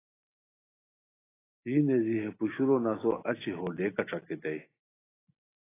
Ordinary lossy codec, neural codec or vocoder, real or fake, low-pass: AAC, 16 kbps; none; real; 3.6 kHz